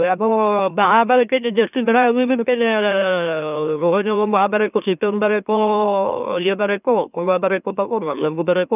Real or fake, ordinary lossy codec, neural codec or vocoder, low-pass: fake; none; autoencoder, 44.1 kHz, a latent of 192 numbers a frame, MeloTTS; 3.6 kHz